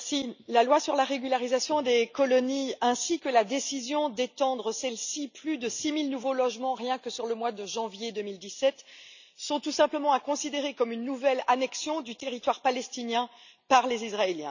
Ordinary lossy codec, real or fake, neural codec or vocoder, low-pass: none; real; none; 7.2 kHz